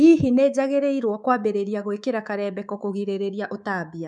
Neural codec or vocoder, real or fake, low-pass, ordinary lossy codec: codec, 24 kHz, 3.1 kbps, DualCodec; fake; none; none